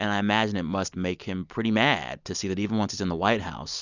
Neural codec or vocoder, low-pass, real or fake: none; 7.2 kHz; real